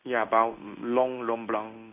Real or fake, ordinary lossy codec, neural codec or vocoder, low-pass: fake; none; codec, 16 kHz in and 24 kHz out, 1 kbps, XY-Tokenizer; 3.6 kHz